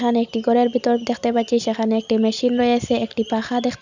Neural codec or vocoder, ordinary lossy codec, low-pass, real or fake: none; none; 7.2 kHz; real